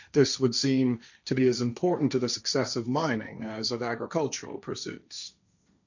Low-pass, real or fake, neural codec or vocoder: 7.2 kHz; fake; codec, 16 kHz, 1.1 kbps, Voila-Tokenizer